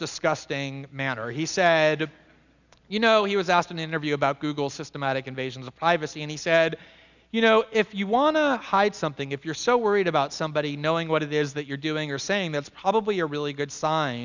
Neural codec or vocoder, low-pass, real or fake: none; 7.2 kHz; real